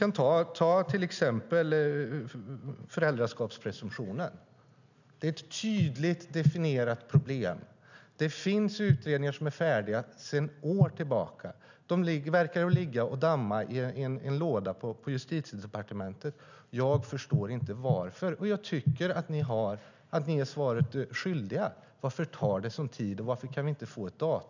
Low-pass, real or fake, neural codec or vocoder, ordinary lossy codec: 7.2 kHz; real; none; none